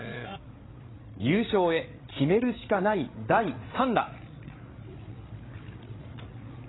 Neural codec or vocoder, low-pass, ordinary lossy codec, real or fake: codec, 16 kHz, 8 kbps, FreqCodec, larger model; 7.2 kHz; AAC, 16 kbps; fake